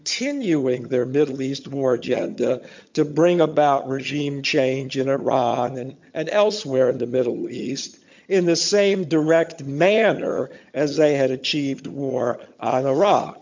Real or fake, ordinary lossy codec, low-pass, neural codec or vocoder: fake; MP3, 64 kbps; 7.2 kHz; vocoder, 22.05 kHz, 80 mel bands, HiFi-GAN